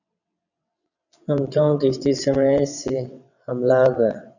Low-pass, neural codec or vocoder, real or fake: 7.2 kHz; vocoder, 22.05 kHz, 80 mel bands, WaveNeXt; fake